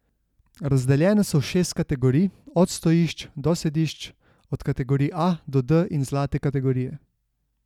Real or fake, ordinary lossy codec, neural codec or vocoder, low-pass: real; none; none; 19.8 kHz